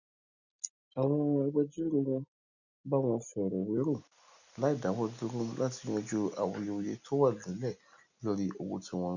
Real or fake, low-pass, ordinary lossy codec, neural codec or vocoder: real; 7.2 kHz; none; none